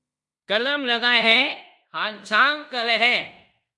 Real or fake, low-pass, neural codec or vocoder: fake; 10.8 kHz; codec, 16 kHz in and 24 kHz out, 0.9 kbps, LongCat-Audio-Codec, fine tuned four codebook decoder